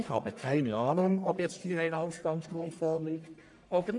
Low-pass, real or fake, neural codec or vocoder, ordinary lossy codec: 10.8 kHz; fake; codec, 44.1 kHz, 1.7 kbps, Pupu-Codec; AAC, 64 kbps